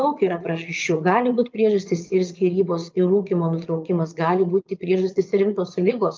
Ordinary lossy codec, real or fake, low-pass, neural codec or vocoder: Opus, 24 kbps; fake; 7.2 kHz; vocoder, 22.05 kHz, 80 mel bands, Vocos